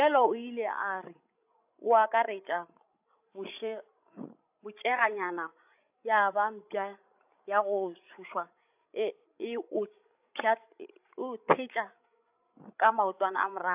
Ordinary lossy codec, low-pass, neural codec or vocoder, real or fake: AAC, 32 kbps; 3.6 kHz; codec, 16 kHz, 16 kbps, FreqCodec, larger model; fake